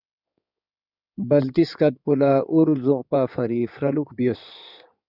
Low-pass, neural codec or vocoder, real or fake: 5.4 kHz; codec, 16 kHz in and 24 kHz out, 2.2 kbps, FireRedTTS-2 codec; fake